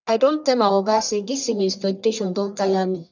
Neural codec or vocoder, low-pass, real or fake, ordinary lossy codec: codec, 44.1 kHz, 1.7 kbps, Pupu-Codec; 7.2 kHz; fake; none